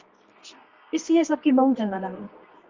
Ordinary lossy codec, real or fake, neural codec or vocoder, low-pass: Opus, 32 kbps; fake; codec, 24 kHz, 0.9 kbps, WavTokenizer, medium music audio release; 7.2 kHz